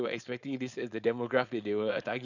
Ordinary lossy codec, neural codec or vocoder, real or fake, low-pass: none; codec, 16 kHz, 4.8 kbps, FACodec; fake; 7.2 kHz